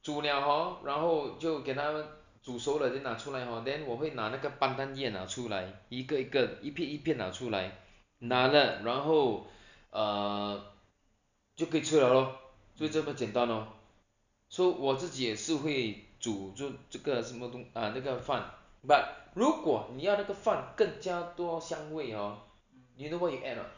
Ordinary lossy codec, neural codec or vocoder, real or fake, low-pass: none; none; real; 7.2 kHz